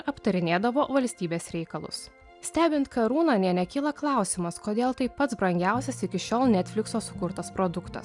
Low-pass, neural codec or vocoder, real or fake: 10.8 kHz; none; real